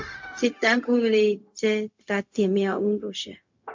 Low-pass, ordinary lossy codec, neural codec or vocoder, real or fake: 7.2 kHz; MP3, 48 kbps; codec, 16 kHz, 0.4 kbps, LongCat-Audio-Codec; fake